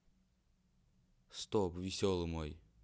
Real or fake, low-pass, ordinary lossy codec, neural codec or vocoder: real; none; none; none